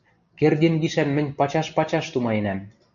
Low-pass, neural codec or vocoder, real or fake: 7.2 kHz; none; real